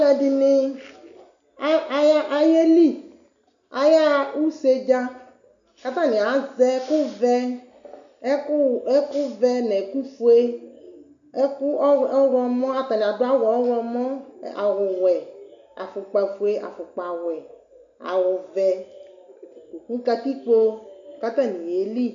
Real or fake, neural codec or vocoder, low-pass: real; none; 7.2 kHz